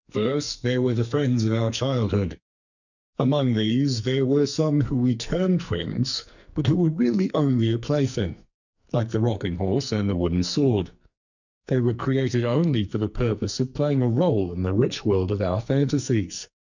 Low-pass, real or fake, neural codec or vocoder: 7.2 kHz; fake; codec, 44.1 kHz, 2.6 kbps, SNAC